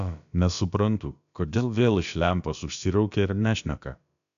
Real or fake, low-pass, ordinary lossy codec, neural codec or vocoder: fake; 7.2 kHz; AAC, 96 kbps; codec, 16 kHz, about 1 kbps, DyCAST, with the encoder's durations